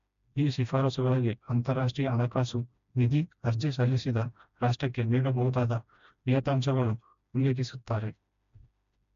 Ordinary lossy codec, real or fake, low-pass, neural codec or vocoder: MP3, 64 kbps; fake; 7.2 kHz; codec, 16 kHz, 1 kbps, FreqCodec, smaller model